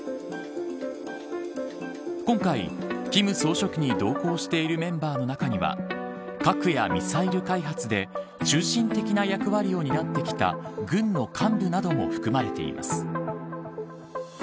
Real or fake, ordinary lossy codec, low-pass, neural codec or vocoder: real; none; none; none